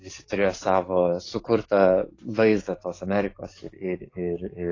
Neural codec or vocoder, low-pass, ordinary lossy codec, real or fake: codec, 44.1 kHz, 7.8 kbps, DAC; 7.2 kHz; AAC, 32 kbps; fake